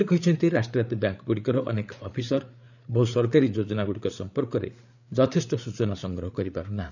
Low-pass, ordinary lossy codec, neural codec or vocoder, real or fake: 7.2 kHz; none; codec, 16 kHz, 8 kbps, FreqCodec, larger model; fake